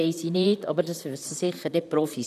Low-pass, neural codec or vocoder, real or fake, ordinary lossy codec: 14.4 kHz; vocoder, 44.1 kHz, 128 mel bands, Pupu-Vocoder; fake; none